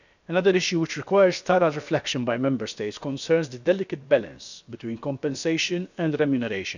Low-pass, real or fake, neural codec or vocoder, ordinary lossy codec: 7.2 kHz; fake; codec, 16 kHz, about 1 kbps, DyCAST, with the encoder's durations; none